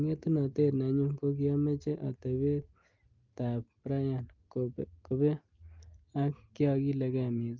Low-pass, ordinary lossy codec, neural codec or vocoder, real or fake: 7.2 kHz; Opus, 24 kbps; none; real